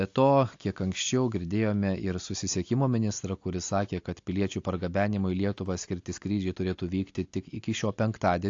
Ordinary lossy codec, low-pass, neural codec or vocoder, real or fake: AAC, 48 kbps; 7.2 kHz; none; real